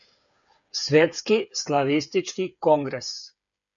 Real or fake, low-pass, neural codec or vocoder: fake; 7.2 kHz; codec, 16 kHz, 8 kbps, FreqCodec, smaller model